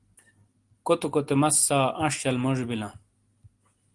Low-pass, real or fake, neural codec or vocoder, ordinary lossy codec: 10.8 kHz; real; none; Opus, 24 kbps